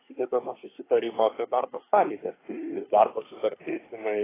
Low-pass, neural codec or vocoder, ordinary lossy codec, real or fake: 3.6 kHz; codec, 24 kHz, 1 kbps, SNAC; AAC, 16 kbps; fake